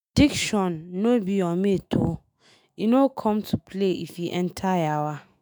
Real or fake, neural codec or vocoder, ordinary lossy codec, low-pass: fake; autoencoder, 48 kHz, 128 numbers a frame, DAC-VAE, trained on Japanese speech; none; none